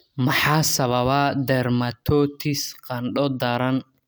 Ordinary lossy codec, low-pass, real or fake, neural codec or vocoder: none; none; real; none